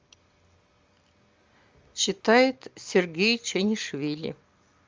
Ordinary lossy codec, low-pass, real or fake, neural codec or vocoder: Opus, 32 kbps; 7.2 kHz; real; none